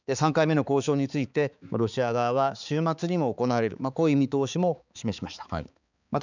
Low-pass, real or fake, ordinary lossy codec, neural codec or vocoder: 7.2 kHz; fake; none; codec, 16 kHz, 4 kbps, X-Codec, HuBERT features, trained on balanced general audio